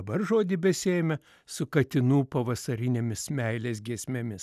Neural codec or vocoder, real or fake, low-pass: none; real; 14.4 kHz